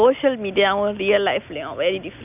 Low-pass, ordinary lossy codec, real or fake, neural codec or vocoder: 3.6 kHz; none; real; none